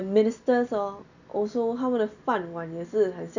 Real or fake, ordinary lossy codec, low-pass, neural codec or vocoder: real; none; 7.2 kHz; none